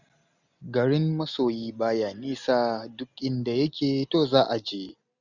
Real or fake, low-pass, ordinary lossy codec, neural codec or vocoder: real; 7.2 kHz; none; none